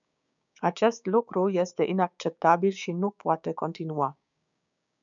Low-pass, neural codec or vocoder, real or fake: 7.2 kHz; codec, 16 kHz, 6 kbps, DAC; fake